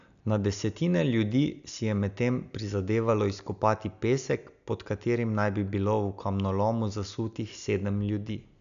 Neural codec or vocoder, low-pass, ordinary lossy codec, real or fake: none; 7.2 kHz; none; real